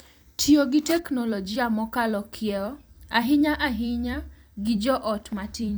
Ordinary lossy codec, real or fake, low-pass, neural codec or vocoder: none; fake; none; vocoder, 44.1 kHz, 128 mel bands every 256 samples, BigVGAN v2